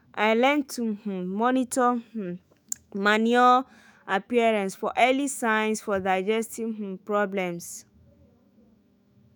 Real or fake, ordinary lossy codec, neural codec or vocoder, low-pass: fake; none; autoencoder, 48 kHz, 128 numbers a frame, DAC-VAE, trained on Japanese speech; none